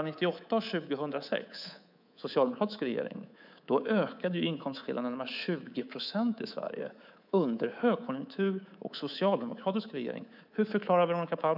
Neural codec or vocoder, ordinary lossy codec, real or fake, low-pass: codec, 24 kHz, 3.1 kbps, DualCodec; none; fake; 5.4 kHz